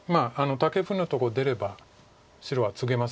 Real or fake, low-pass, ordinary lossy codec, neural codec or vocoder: real; none; none; none